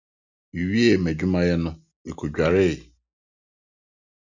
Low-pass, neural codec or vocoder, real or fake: 7.2 kHz; none; real